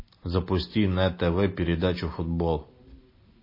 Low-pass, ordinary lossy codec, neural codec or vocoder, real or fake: 5.4 kHz; MP3, 24 kbps; none; real